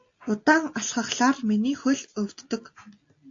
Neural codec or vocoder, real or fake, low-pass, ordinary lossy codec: none; real; 7.2 kHz; AAC, 48 kbps